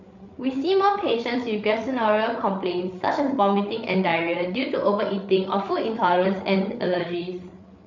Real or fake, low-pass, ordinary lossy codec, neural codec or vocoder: fake; 7.2 kHz; none; codec, 16 kHz, 8 kbps, FreqCodec, larger model